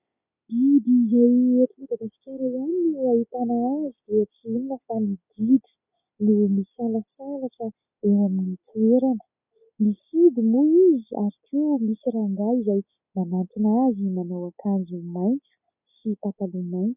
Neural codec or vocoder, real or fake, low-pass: none; real; 3.6 kHz